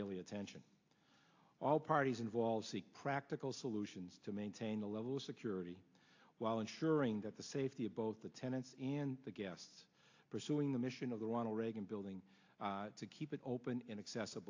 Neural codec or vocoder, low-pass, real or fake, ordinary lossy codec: none; 7.2 kHz; real; AAC, 48 kbps